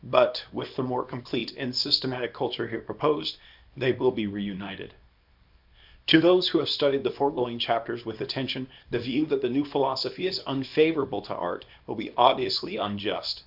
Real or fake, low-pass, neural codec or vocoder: fake; 5.4 kHz; codec, 24 kHz, 0.9 kbps, WavTokenizer, small release